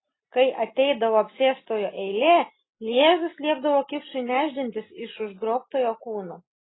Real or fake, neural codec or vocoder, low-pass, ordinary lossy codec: real; none; 7.2 kHz; AAC, 16 kbps